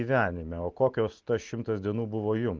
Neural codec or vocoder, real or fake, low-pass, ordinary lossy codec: none; real; 7.2 kHz; Opus, 32 kbps